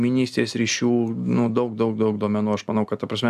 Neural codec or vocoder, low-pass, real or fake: none; 14.4 kHz; real